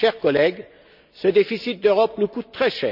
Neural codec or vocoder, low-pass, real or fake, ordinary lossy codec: none; 5.4 kHz; real; none